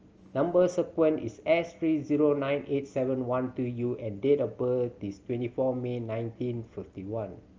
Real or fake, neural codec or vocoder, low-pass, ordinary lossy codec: real; none; 7.2 kHz; Opus, 24 kbps